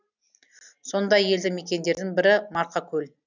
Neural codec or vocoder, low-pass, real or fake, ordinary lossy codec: none; 7.2 kHz; real; none